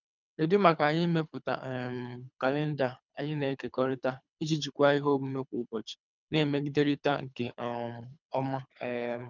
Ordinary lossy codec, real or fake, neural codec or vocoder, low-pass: none; fake; codec, 24 kHz, 3 kbps, HILCodec; 7.2 kHz